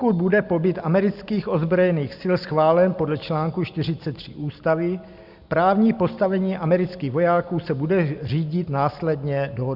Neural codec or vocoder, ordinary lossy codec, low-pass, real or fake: none; Opus, 64 kbps; 5.4 kHz; real